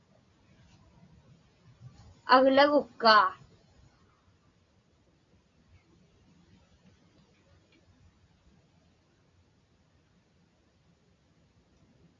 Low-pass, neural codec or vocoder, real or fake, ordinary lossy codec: 7.2 kHz; none; real; AAC, 48 kbps